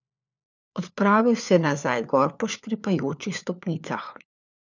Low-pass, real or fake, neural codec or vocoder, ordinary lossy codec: 7.2 kHz; fake; codec, 16 kHz, 4 kbps, FunCodec, trained on LibriTTS, 50 frames a second; none